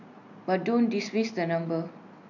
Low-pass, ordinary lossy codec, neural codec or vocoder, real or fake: 7.2 kHz; none; none; real